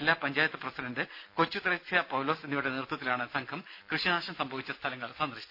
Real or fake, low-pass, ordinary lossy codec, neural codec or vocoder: real; 5.4 kHz; none; none